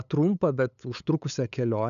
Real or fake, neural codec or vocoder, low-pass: fake; codec, 16 kHz, 8 kbps, FunCodec, trained on LibriTTS, 25 frames a second; 7.2 kHz